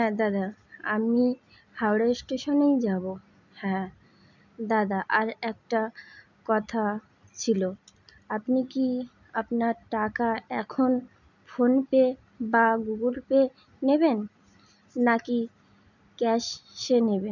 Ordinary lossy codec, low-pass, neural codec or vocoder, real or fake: none; 7.2 kHz; none; real